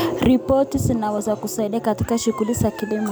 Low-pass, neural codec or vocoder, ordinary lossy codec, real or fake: none; none; none; real